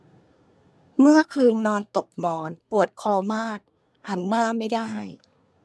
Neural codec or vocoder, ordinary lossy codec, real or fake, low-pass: codec, 24 kHz, 1 kbps, SNAC; none; fake; none